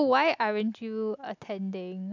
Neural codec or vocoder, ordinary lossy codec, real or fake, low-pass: none; none; real; 7.2 kHz